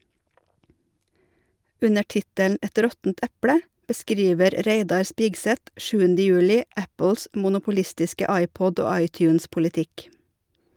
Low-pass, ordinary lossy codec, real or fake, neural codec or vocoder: 19.8 kHz; Opus, 32 kbps; real; none